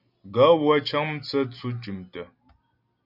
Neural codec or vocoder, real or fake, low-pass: none; real; 5.4 kHz